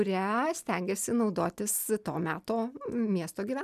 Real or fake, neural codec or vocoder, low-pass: real; none; 14.4 kHz